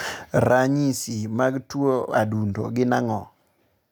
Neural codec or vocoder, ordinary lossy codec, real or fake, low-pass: none; none; real; none